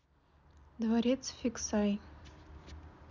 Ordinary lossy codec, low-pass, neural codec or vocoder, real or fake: none; 7.2 kHz; none; real